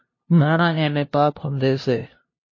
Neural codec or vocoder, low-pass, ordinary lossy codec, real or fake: codec, 16 kHz, 0.5 kbps, FunCodec, trained on LibriTTS, 25 frames a second; 7.2 kHz; MP3, 32 kbps; fake